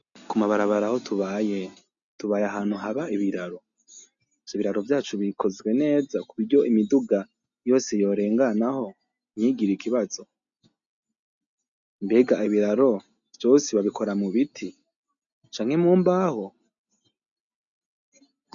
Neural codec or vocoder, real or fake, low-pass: none; real; 7.2 kHz